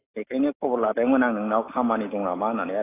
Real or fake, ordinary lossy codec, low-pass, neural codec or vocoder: real; AAC, 16 kbps; 3.6 kHz; none